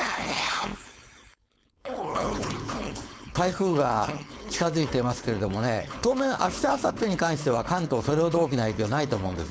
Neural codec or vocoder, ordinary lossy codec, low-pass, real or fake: codec, 16 kHz, 4.8 kbps, FACodec; none; none; fake